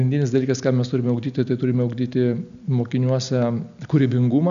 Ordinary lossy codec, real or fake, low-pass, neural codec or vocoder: MP3, 96 kbps; real; 7.2 kHz; none